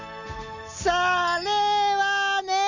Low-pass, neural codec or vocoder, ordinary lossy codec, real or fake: 7.2 kHz; none; none; real